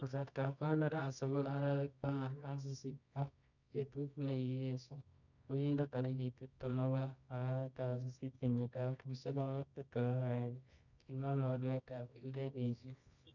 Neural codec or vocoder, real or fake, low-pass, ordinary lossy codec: codec, 24 kHz, 0.9 kbps, WavTokenizer, medium music audio release; fake; 7.2 kHz; none